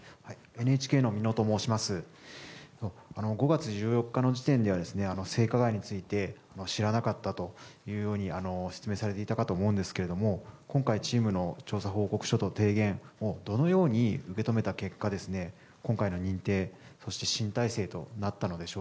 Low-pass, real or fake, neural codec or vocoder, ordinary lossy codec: none; real; none; none